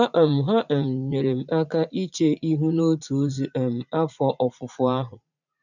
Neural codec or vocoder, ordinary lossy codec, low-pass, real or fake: vocoder, 44.1 kHz, 80 mel bands, Vocos; none; 7.2 kHz; fake